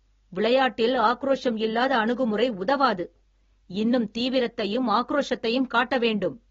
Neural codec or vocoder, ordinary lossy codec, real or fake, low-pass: none; AAC, 24 kbps; real; 7.2 kHz